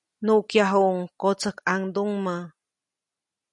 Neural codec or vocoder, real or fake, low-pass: none; real; 10.8 kHz